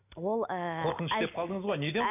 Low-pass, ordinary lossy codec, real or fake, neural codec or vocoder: 3.6 kHz; none; real; none